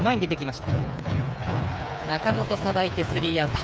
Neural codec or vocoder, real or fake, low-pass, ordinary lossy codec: codec, 16 kHz, 4 kbps, FreqCodec, smaller model; fake; none; none